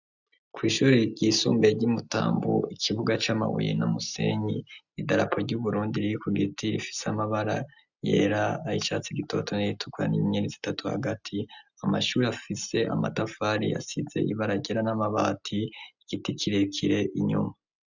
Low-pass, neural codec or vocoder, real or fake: 7.2 kHz; none; real